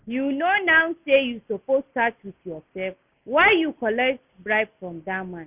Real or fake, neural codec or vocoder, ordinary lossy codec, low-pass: real; none; none; 3.6 kHz